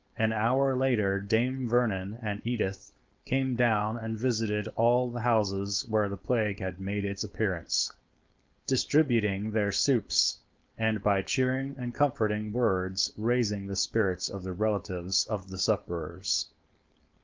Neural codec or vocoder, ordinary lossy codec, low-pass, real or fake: codec, 16 kHz, 4.8 kbps, FACodec; Opus, 24 kbps; 7.2 kHz; fake